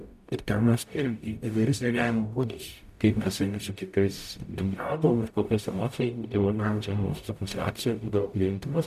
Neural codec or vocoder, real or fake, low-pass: codec, 44.1 kHz, 0.9 kbps, DAC; fake; 14.4 kHz